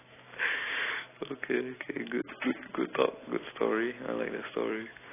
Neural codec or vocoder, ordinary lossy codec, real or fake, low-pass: none; AAC, 16 kbps; real; 3.6 kHz